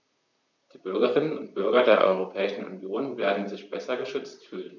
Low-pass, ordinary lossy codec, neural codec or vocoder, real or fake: 7.2 kHz; none; vocoder, 44.1 kHz, 128 mel bands, Pupu-Vocoder; fake